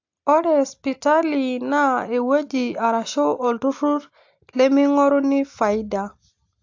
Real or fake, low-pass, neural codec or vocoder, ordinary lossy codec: real; 7.2 kHz; none; AAC, 48 kbps